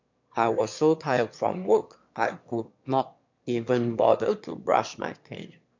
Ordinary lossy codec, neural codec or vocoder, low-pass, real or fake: MP3, 64 kbps; autoencoder, 22.05 kHz, a latent of 192 numbers a frame, VITS, trained on one speaker; 7.2 kHz; fake